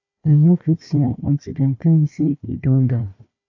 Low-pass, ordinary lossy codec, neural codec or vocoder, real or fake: 7.2 kHz; AAC, 48 kbps; codec, 16 kHz, 1 kbps, FunCodec, trained on Chinese and English, 50 frames a second; fake